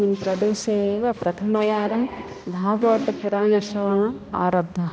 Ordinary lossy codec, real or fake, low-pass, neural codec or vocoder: none; fake; none; codec, 16 kHz, 1 kbps, X-Codec, HuBERT features, trained on balanced general audio